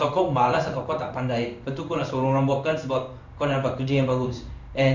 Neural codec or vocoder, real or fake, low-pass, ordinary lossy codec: codec, 16 kHz in and 24 kHz out, 1 kbps, XY-Tokenizer; fake; 7.2 kHz; none